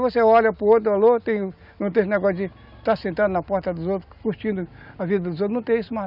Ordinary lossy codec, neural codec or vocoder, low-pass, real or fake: none; none; 5.4 kHz; real